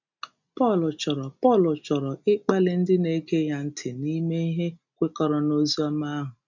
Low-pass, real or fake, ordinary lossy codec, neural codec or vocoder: 7.2 kHz; real; none; none